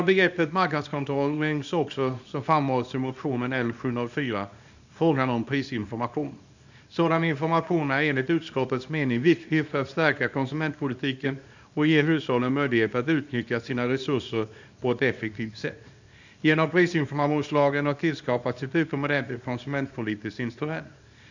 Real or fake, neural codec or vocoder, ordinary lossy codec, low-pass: fake; codec, 24 kHz, 0.9 kbps, WavTokenizer, small release; none; 7.2 kHz